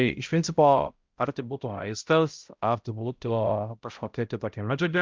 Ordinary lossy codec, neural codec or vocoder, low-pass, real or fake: Opus, 24 kbps; codec, 16 kHz, 0.5 kbps, X-Codec, HuBERT features, trained on balanced general audio; 7.2 kHz; fake